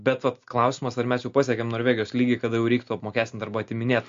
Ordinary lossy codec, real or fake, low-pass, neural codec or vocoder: MP3, 48 kbps; real; 7.2 kHz; none